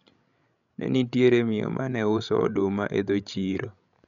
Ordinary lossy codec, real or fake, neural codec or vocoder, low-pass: none; fake; codec, 16 kHz, 16 kbps, FreqCodec, larger model; 7.2 kHz